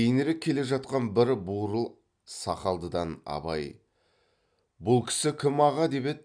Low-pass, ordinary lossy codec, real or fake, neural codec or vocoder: 9.9 kHz; none; real; none